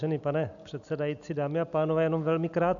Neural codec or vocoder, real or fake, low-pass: none; real; 7.2 kHz